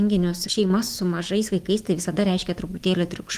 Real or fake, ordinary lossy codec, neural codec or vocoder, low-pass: fake; Opus, 24 kbps; autoencoder, 48 kHz, 128 numbers a frame, DAC-VAE, trained on Japanese speech; 14.4 kHz